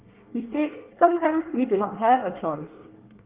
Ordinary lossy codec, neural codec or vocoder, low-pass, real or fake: Opus, 32 kbps; codec, 24 kHz, 1 kbps, SNAC; 3.6 kHz; fake